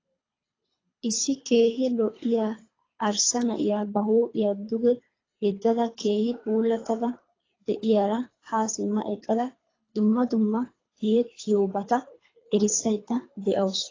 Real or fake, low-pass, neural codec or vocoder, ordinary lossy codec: fake; 7.2 kHz; codec, 24 kHz, 3 kbps, HILCodec; AAC, 32 kbps